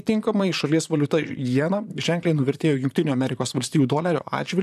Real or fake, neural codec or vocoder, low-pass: fake; vocoder, 44.1 kHz, 128 mel bands, Pupu-Vocoder; 14.4 kHz